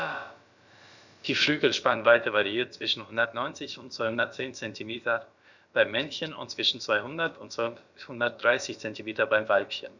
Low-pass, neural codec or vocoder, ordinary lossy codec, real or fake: 7.2 kHz; codec, 16 kHz, about 1 kbps, DyCAST, with the encoder's durations; none; fake